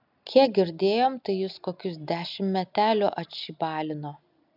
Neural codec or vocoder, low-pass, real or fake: none; 5.4 kHz; real